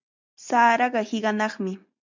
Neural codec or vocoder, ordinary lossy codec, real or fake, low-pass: none; MP3, 64 kbps; real; 7.2 kHz